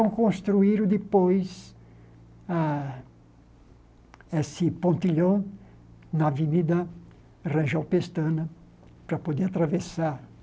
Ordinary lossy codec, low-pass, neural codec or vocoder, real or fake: none; none; none; real